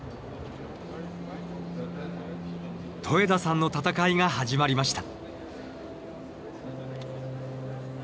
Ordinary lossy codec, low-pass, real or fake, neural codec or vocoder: none; none; real; none